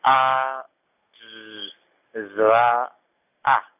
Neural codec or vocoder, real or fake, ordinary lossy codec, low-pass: none; real; none; 3.6 kHz